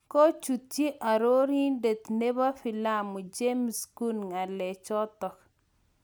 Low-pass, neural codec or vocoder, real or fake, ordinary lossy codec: none; none; real; none